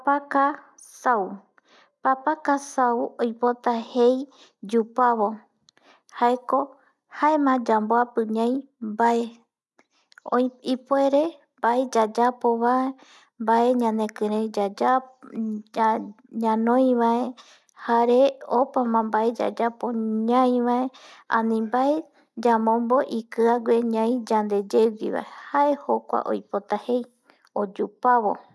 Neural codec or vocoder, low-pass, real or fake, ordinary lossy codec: none; none; real; none